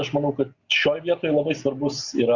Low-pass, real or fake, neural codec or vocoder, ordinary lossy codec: 7.2 kHz; real; none; Opus, 64 kbps